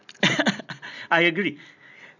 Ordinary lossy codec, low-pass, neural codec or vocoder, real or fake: none; 7.2 kHz; none; real